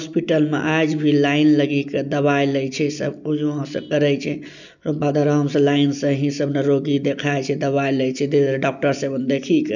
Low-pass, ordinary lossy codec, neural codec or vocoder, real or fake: 7.2 kHz; none; none; real